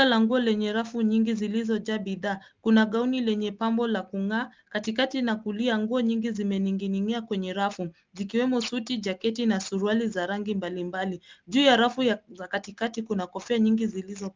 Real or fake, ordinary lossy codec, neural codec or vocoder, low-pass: real; Opus, 32 kbps; none; 7.2 kHz